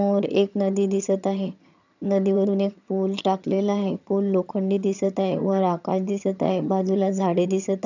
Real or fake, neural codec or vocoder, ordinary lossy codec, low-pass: fake; vocoder, 22.05 kHz, 80 mel bands, HiFi-GAN; none; 7.2 kHz